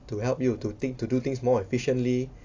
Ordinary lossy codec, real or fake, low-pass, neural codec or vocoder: AAC, 48 kbps; real; 7.2 kHz; none